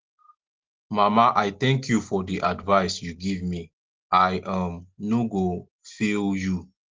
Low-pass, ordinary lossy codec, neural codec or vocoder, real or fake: 7.2 kHz; Opus, 16 kbps; none; real